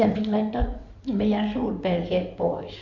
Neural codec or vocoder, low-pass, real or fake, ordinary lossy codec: codec, 16 kHz in and 24 kHz out, 2.2 kbps, FireRedTTS-2 codec; 7.2 kHz; fake; none